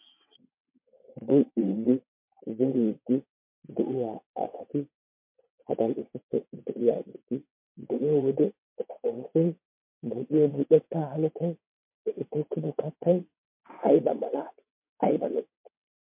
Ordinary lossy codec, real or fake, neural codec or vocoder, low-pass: MP3, 32 kbps; fake; vocoder, 22.05 kHz, 80 mel bands, WaveNeXt; 3.6 kHz